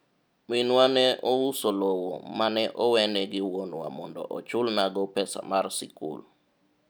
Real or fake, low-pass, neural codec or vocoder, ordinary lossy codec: real; none; none; none